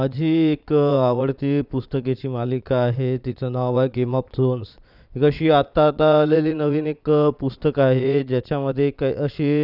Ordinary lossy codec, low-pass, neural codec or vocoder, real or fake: none; 5.4 kHz; vocoder, 22.05 kHz, 80 mel bands, Vocos; fake